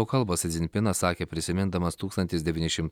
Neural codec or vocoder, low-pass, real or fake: none; 19.8 kHz; real